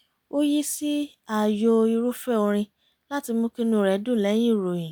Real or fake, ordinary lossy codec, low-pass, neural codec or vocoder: real; none; none; none